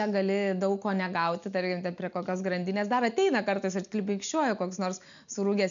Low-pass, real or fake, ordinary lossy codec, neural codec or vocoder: 7.2 kHz; real; MP3, 96 kbps; none